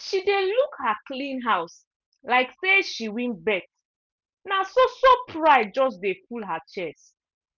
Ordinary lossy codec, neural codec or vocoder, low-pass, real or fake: none; none; 7.2 kHz; real